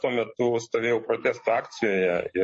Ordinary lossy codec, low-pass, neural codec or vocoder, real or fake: MP3, 32 kbps; 10.8 kHz; codec, 44.1 kHz, 7.8 kbps, DAC; fake